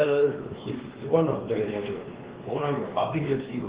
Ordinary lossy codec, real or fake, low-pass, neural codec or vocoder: Opus, 16 kbps; fake; 3.6 kHz; codec, 16 kHz, 2 kbps, X-Codec, WavLM features, trained on Multilingual LibriSpeech